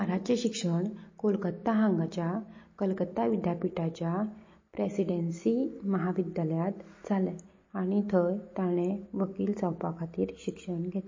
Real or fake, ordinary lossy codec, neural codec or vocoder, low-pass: real; MP3, 32 kbps; none; 7.2 kHz